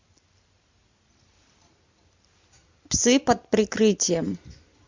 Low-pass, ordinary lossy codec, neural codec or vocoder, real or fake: 7.2 kHz; MP3, 64 kbps; none; real